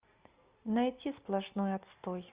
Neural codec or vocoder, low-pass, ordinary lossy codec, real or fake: none; 3.6 kHz; Opus, 64 kbps; real